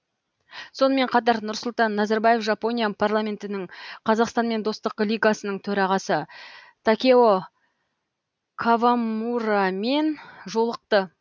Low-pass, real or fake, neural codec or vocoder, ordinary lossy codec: none; real; none; none